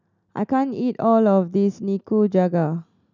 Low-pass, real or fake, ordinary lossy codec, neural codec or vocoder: 7.2 kHz; real; none; none